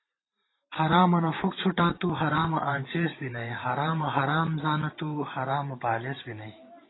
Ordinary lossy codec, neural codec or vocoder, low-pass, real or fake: AAC, 16 kbps; vocoder, 44.1 kHz, 128 mel bands every 512 samples, BigVGAN v2; 7.2 kHz; fake